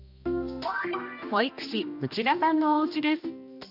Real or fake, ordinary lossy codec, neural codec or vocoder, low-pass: fake; none; codec, 16 kHz, 1 kbps, X-Codec, HuBERT features, trained on general audio; 5.4 kHz